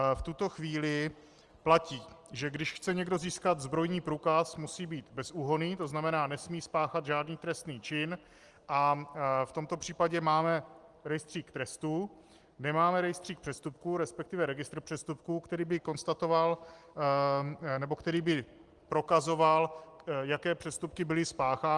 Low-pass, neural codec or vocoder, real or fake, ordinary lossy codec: 10.8 kHz; none; real; Opus, 24 kbps